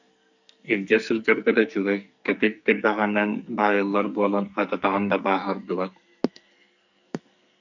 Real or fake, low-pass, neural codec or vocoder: fake; 7.2 kHz; codec, 44.1 kHz, 2.6 kbps, SNAC